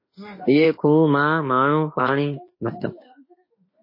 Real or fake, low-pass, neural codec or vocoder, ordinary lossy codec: fake; 5.4 kHz; autoencoder, 48 kHz, 32 numbers a frame, DAC-VAE, trained on Japanese speech; MP3, 24 kbps